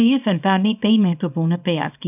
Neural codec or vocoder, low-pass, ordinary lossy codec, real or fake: codec, 24 kHz, 0.9 kbps, WavTokenizer, small release; 3.6 kHz; none; fake